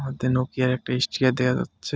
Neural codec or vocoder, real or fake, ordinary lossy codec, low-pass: none; real; none; none